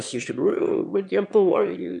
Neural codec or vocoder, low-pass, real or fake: autoencoder, 22.05 kHz, a latent of 192 numbers a frame, VITS, trained on one speaker; 9.9 kHz; fake